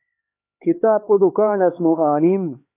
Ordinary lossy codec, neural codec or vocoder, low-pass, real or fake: AAC, 24 kbps; codec, 16 kHz, 4 kbps, X-Codec, HuBERT features, trained on LibriSpeech; 3.6 kHz; fake